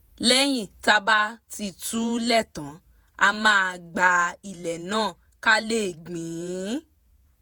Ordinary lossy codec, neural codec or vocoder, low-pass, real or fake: none; vocoder, 48 kHz, 128 mel bands, Vocos; none; fake